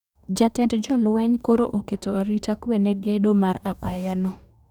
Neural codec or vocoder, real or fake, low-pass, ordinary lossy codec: codec, 44.1 kHz, 2.6 kbps, DAC; fake; 19.8 kHz; none